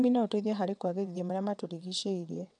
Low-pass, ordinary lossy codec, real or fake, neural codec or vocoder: 9.9 kHz; none; fake; vocoder, 22.05 kHz, 80 mel bands, WaveNeXt